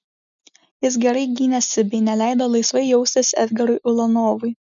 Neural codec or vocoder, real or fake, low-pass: none; real; 7.2 kHz